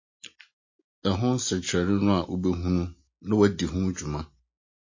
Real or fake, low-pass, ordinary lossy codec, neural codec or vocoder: real; 7.2 kHz; MP3, 32 kbps; none